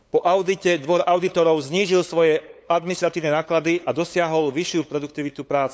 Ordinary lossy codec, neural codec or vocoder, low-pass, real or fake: none; codec, 16 kHz, 8 kbps, FunCodec, trained on LibriTTS, 25 frames a second; none; fake